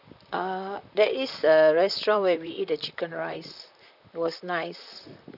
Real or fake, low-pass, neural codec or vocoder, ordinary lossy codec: fake; 5.4 kHz; vocoder, 44.1 kHz, 128 mel bands, Pupu-Vocoder; none